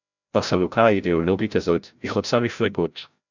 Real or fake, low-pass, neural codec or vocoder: fake; 7.2 kHz; codec, 16 kHz, 0.5 kbps, FreqCodec, larger model